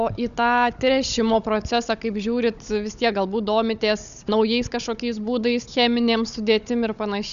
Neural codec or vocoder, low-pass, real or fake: codec, 16 kHz, 16 kbps, FunCodec, trained on Chinese and English, 50 frames a second; 7.2 kHz; fake